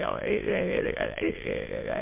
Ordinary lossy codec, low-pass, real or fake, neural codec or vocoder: MP3, 24 kbps; 3.6 kHz; fake; autoencoder, 22.05 kHz, a latent of 192 numbers a frame, VITS, trained on many speakers